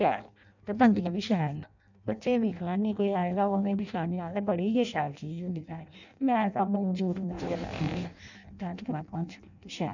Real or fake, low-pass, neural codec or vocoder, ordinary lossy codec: fake; 7.2 kHz; codec, 16 kHz in and 24 kHz out, 0.6 kbps, FireRedTTS-2 codec; none